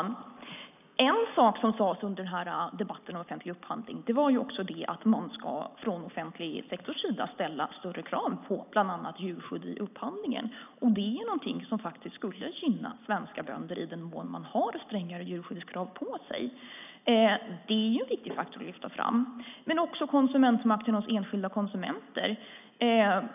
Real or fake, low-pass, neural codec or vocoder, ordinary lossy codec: real; 3.6 kHz; none; none